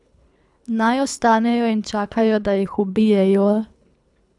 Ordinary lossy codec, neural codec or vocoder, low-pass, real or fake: none; codec, 24 kHz, 3 kbps, HILCodec; 10.8 kHz; fake